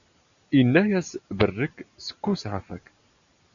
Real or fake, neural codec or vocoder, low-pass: real; none; 7.2 kHz